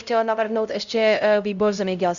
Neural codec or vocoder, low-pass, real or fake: codec, 16 kHz, 0.5 kbps, X-Codec, HuBERT features, trained on LibriSpeech; 7.2 kHz; fake